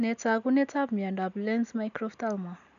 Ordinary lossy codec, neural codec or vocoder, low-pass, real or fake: none; none; 7.2 kHz; real